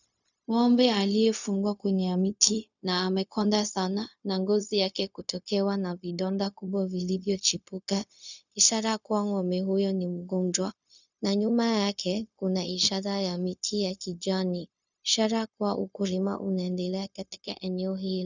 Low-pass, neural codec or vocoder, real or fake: 7.2 kHz; codec, 16 kHz, 0.4 kbps, LongCat-Audio-Codec; fake